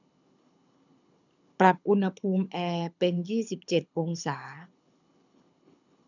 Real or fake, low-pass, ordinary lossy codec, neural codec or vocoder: fake; 7.2 kHz; none; codec, 24 kHz, 6 kbps, HILCodec